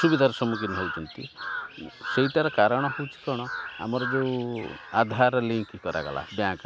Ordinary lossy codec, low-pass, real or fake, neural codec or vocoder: none; none; real; none